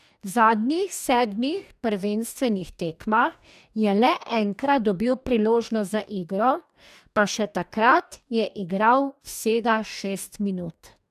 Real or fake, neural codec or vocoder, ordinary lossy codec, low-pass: fake; codec, 44.1 kHz, 2.6 kbps, DAC; none; 14.4 kHz